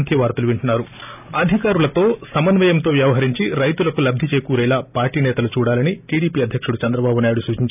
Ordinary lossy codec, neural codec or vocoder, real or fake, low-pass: none; none; real; 3.6 kHz